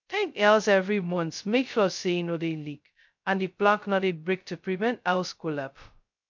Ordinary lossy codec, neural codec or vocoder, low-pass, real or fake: MP3, 64 kbps; codec, 16 kHz, 0.2 kbps, FocalCodec; 7.2 kHz; fake